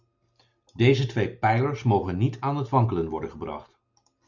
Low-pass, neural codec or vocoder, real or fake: 7.2 kHz; none; real